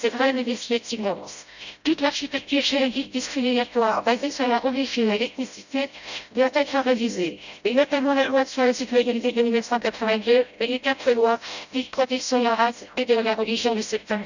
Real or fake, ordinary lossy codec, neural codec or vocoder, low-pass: fake; none; codec, 16 kHz, 0.5 kbps, FreqCodec, smaller model; 7.2 kHz